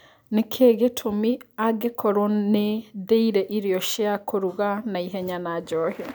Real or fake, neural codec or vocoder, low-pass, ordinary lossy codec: real; none; none; none